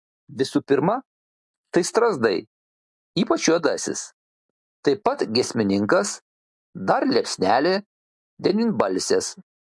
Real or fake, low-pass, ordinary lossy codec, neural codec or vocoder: real; 10.8 kHz; MP3, 64 kbps; none